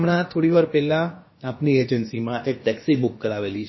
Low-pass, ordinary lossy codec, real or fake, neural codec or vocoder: 7.2 kHz; MP3, 24 kbps; fake; codec, 16 kHz, about 1 kbps, DyCAST, with the encoder's durations